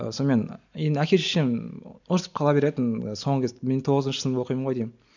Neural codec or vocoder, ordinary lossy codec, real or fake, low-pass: none; none; real; 7.2 kHz